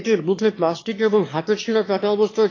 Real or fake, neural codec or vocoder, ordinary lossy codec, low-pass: fake; autoencoder, 22.05 kHz, a latent of 192 numbers a frame, VITS, trained on one speaker; AAC, 32 kbps; 7.2 kHz